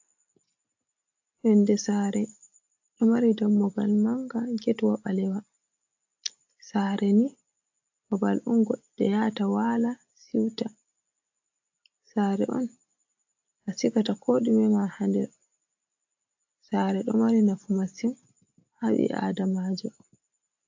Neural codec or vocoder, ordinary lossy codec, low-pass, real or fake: none; AAC, 48 kbps; 7.2 kHz; real